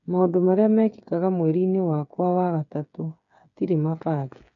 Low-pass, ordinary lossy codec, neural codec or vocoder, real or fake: 7.2 kHz; none; codec, 16 kHz, 8 kbps, FreqCodec, smaller model; fake